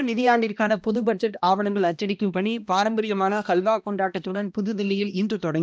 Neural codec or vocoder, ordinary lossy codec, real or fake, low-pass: codec, 16 kHz, 1 kbps, X-Codec, HuBERT features, trained on balanced general audio; none; fake; none